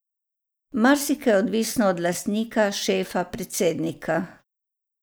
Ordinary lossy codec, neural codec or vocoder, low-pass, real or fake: none; none; none; real